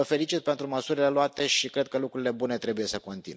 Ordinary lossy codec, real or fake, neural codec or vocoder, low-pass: none; real; none; none